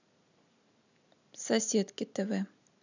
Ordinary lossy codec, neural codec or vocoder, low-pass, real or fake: none; none; 7.2 kHz; real